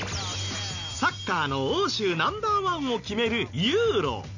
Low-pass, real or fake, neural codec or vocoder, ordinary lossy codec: 7.2 kHz; real; none; none